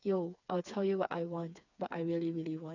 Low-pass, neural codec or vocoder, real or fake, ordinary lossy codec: 7.2 kHz; codec, 16 kHz, 4 kbps, FreqCodec, smaller model; fake; none